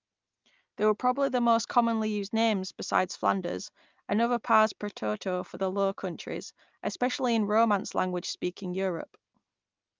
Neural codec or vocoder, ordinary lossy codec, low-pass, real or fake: none; Opus, 24 kbps; 7.2 kHz; real